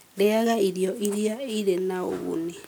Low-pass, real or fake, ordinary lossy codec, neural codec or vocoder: none; real; none; none